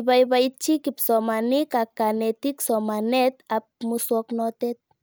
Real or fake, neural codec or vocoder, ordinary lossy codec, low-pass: real; none; none; none